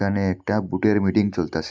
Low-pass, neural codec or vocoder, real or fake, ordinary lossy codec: none; none; real; none